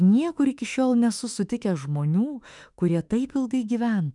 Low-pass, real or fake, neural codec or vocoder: 10.8 kHz; fake; autoencoder, 48 kHz, 32 numbers a frame, DAC-VAE, trained on Japanese speech